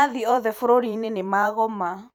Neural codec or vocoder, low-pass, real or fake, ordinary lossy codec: vocoder, 44.1 kHz, 128 mel bands every 512 samples, BigVGAN v2; none; fake; none